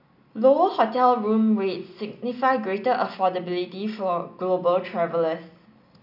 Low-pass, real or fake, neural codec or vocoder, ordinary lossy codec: 5.4 kHz; real; none; none